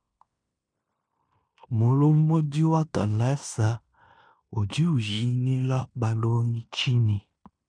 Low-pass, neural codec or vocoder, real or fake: 9.9 kHz; codec, 16 kHz in and 24 kHz out, 0.9 kbps, LongCat-Audio-Codec, fine tuned four codebook decoder; fake